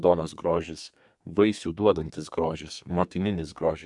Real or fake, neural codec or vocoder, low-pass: fake; codec, 32 kHz, 1.9 kbps, SNAC; 10.8 kHz